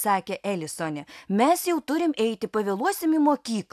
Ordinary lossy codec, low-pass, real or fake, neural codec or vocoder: AAC, 96 kbps; 14.4 kHz; real; none